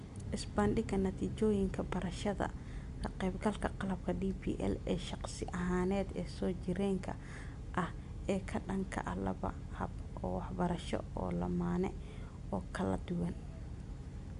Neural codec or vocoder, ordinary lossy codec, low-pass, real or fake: none; MP3, 64 kbps; 10.8 kHz; real